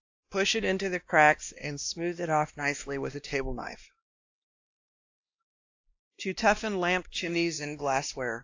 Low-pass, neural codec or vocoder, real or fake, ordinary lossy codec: 7.2 kHz; codec, 16 kHz, 1 kbps, X-Codec, WavLM features, trained on Multilingual LibriSpeech; fake; AAC, 48 kbps